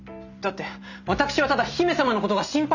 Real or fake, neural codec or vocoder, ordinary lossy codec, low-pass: real; none; none; 7.2 kHz